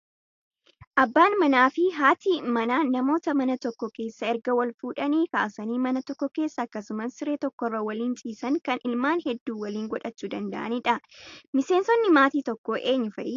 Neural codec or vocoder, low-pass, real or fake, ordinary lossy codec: none; 7.2 kHz; real; AAC, 48 kbps